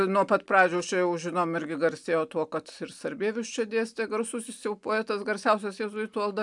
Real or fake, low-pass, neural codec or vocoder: real; 10.8 kHz; none